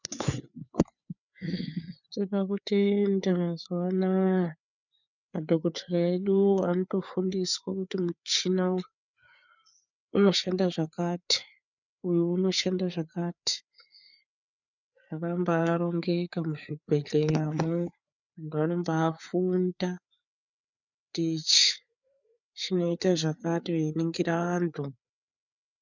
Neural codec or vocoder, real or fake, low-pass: codec, 16 kHz, 4 kbps, FreqCodec, larger model; fake; 7.2 kHz